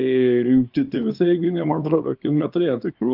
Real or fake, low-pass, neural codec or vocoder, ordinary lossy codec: fake; 5.4 kHz; codec, 24 kHz, 0.9 kbps, WavTokenizer, small release; Opus, 24 kbps